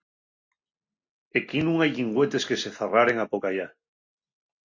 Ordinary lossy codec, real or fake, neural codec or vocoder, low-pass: MP3, 48 kbps; real; none; 7.2 kHz